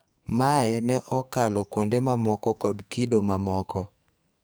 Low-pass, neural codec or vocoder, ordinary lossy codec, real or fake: none; codec, 44.1 kHz, 2.6 kbps, SNAC; none; fake